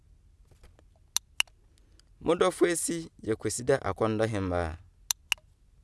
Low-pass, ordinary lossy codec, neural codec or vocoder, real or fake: none; none; none; real